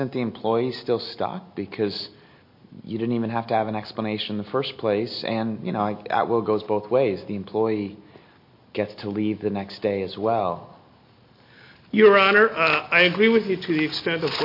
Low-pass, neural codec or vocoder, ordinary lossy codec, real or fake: 5.4 kHz; none; MP3, 32 kbps; real